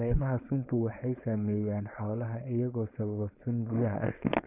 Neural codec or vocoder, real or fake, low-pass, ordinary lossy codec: codec, 16 kHz, 4.8 kbps, FACodec; fake; 3.6 kHz; none